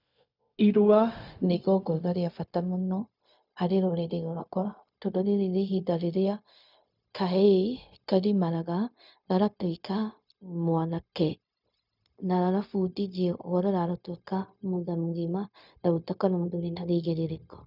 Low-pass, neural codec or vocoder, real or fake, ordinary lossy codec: 5.4 kHz; codec, 16 kHz, 0.4 kbps, LongCat-Audio-Codec; fake; none